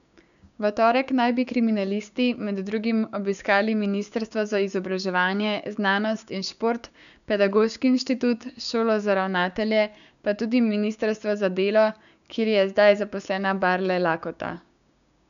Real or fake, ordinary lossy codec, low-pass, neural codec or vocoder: fake; none; 7.2 kHz; codec, 16 kHz, 6 kbps, DAC